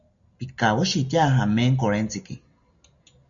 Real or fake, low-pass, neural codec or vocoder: real; 7.2 kHz; none